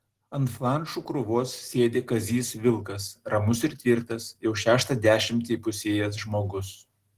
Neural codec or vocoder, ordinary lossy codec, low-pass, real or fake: none; Opus, 16 kbps; 14.4 kHz; real